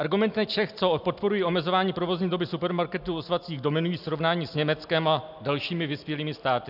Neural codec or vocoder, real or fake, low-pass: none; real; 5.4 kHz